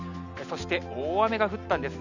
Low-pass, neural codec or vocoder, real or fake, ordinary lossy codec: 7.2 kHz; none; real; none